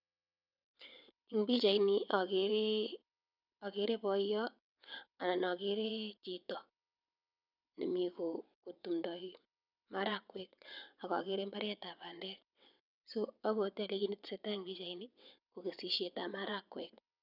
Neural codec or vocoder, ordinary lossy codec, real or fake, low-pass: vocoder, 22.05 kHz, 80 mel bands, WaveNeXt; none; fake; 5.4 kHz